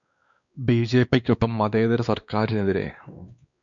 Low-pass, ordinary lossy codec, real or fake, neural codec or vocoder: 7.2 kHz; MP3, 64 kbps; fake; codec, 16 kHz, 2 kbps, X-Codec, WavLM features, trained on Multilingual LibriSpeech